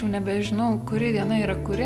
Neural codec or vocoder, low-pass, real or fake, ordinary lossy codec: none; 14.4 kHz; real; Opus, 64 kbps